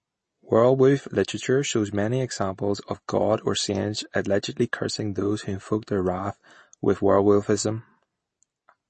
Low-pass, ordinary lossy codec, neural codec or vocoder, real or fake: 9.9 kHz; MP3, 32 kbps; none; real